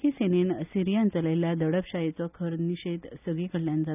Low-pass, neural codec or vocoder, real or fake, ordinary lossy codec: 3.6 kHz; none; real; none